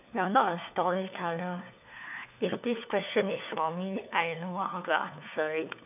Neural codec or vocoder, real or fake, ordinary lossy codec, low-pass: codec, 16 kHz, 4 kbps, FunCodec, trained on LibriTTS, 50 frames a second; fake; none; 3.6 kHz